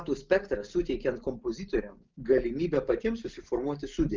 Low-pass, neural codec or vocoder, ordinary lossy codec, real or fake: 7.2 kHz; none; Opus, 32 kbps; real